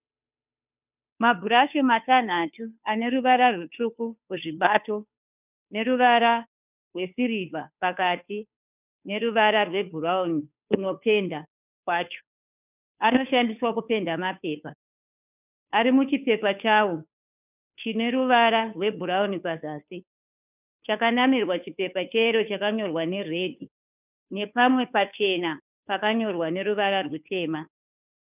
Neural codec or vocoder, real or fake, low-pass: codec, 16 kHz, 2 kbps, FunCodec, trained on Chinese and English, 25 frames a second; fake; 3.6 kHz